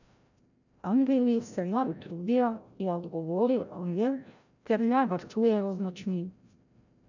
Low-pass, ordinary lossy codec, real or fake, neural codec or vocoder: 7.2 kHz; none; fake; codec, 16 kHz, 0.5 kbps, FreqCodec, larger model